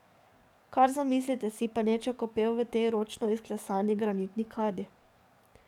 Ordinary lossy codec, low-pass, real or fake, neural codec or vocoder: none; 19.8 kHz; fake; codec, 44.1 kHz, 7.8 kbps, DAC